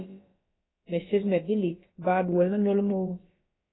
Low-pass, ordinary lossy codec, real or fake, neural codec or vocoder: 7.2 kHz; AAC, 16 kbps; fake; codec, 16 kHz, about 1 kbps, DyCAST, with the encoder's durations